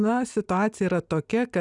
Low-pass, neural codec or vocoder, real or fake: 10.8 kHz; none; real